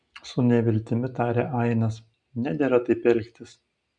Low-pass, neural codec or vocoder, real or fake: 9.9 kHz; none; real